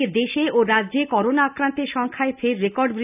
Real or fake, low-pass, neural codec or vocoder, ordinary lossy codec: real; 3.6 kHz; none; none